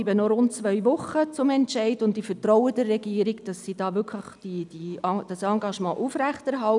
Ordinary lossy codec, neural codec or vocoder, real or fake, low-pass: none; none; real; 10.8 kHz